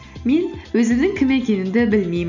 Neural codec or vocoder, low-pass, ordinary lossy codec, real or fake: none; 7.2 kHz; none; real